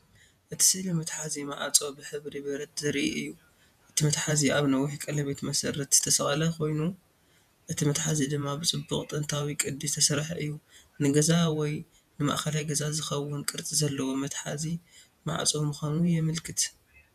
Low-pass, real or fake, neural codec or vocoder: 14.4 kHz; fake; vocoder, 48 kHz, 128 mel bands, Vocos